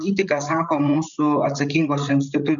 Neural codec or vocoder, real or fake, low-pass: codec, 16 kHz, 8 kbps, FreqCodec, larger model; fake; 7.2 kHz